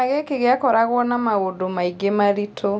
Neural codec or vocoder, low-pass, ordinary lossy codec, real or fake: none; none; none; real